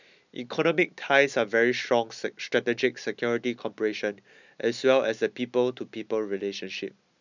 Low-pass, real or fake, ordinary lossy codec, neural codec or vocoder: 7.2 kHz; real; none; none